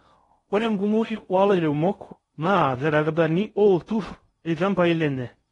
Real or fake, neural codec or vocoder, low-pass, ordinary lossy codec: fake; codec, 16 kHz in and 24 kHz out, 0.6 kbps, FocalCodec, streaming, 4096 codes; 10.8 kHz; AAC, 32 kbps